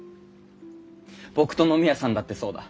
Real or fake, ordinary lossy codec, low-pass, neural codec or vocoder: real; none; none; none